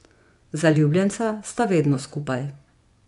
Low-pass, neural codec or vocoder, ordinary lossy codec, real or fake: 10.8 kHz; vocoder, 24 kHz, 100 mel bands, Vocos; none; fake